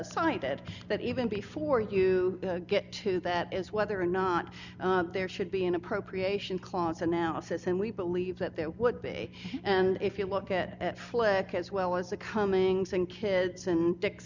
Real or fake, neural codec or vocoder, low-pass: real; none; 7.2 kHz